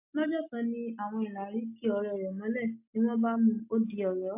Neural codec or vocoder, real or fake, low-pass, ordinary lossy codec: none; real; 3.6 kHz; AAC, 32 kbps